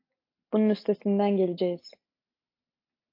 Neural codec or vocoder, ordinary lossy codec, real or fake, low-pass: none; AAC, 32 kbps; real; 5.4 kHz